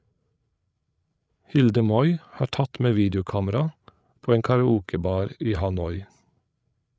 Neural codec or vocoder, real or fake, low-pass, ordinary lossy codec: codec, 16 kHz, 8 kbps, FreqCodec, larger model; fake; none; none